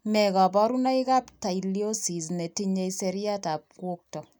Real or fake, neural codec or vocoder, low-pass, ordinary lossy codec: real; none; none; none